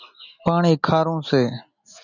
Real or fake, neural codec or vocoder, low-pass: real; none; 7.2 kHz